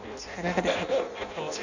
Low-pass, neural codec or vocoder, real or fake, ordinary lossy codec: 7.2 kHz; codec, 16 kHz in and 24 kHz out, 0.6 kbps, FireRedTTS-2 codec; fake; none